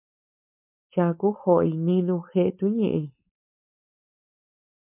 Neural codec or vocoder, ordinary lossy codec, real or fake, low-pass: codec, 16 kHz, 4.8 kbps, FACodec; MP3, 32 kbps; fake; 3.6 kHz